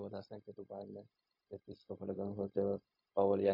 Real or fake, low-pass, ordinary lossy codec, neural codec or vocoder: fake; 5.4 kHz; MP3, 24 kbps; codec, 16 kHz, 0.4 kbps, LongCat-Audio-Codec